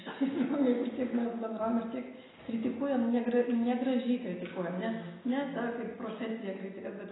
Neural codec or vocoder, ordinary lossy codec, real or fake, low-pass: none; AAC, 16 kbps; real; 7.2 kHz